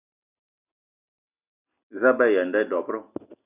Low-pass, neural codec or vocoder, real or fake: 3.6 kHz; none; real